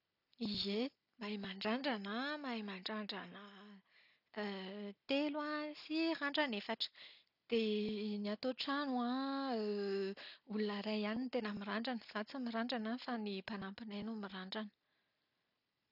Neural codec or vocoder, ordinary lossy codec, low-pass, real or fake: none; none; 5.4 kHz; real